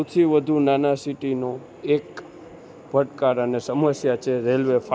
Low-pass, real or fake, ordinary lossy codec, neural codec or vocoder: none; real; none; none